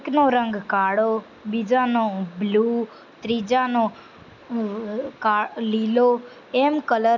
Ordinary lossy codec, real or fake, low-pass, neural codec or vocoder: none; real; 7.2 kHz; none